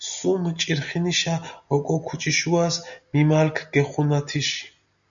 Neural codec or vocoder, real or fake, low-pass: none; real; 7.2 kHz